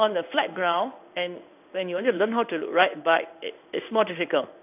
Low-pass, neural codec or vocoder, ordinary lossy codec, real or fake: 3.6 kHz; codec, 16 kHz in and 24 kHz out, 1 kbps, XY-Tokenizer; none; fake